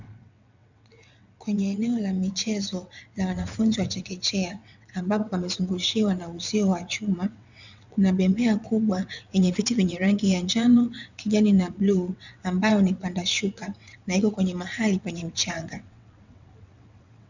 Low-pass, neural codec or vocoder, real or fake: 7.2 kHz; vocoder, 22.05 kHz, 80 mel bands, WaveNeXt; fake